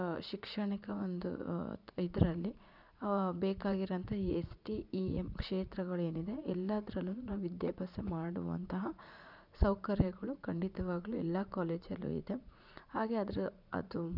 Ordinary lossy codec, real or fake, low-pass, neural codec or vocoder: none; fake; 5.4 kHz; vocoder, 44.1 kHz, 80 mel bands, Vocos